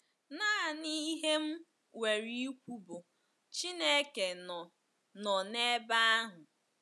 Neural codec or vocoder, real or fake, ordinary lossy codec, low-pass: none; real; none; none